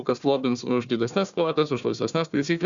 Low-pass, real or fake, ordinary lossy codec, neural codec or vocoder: 7.2 kHz; fake; Opus, 64 kbps; codec, 16 kHz, 1 kbps, FunCodec, trained on Chinese and English, 50 frames a second